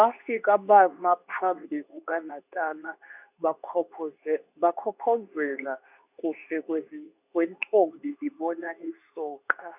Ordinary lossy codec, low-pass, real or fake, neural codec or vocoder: none; 3.6 kHz; fake; codec, 24 kHz, 0.9 kbps, WavTokenizer, medium speech release version 2